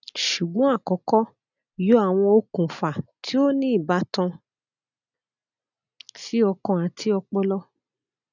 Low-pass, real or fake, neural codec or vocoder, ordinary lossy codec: 7.2 kHz; real; none; none